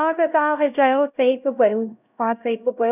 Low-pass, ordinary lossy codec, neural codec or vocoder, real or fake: 3.6 kHz; none; codec, 16 kHz, 0.5 kbps, X-Codec, HuBERT features, trained on LibriSpeech; fake